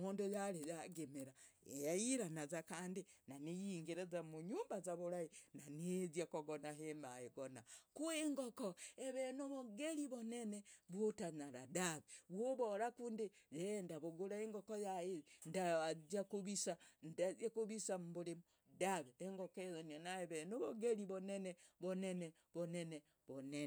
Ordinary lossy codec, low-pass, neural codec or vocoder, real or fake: none; none; none; real